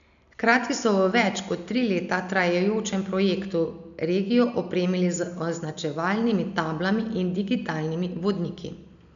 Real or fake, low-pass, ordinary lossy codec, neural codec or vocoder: real; 7.2 kHz; none; none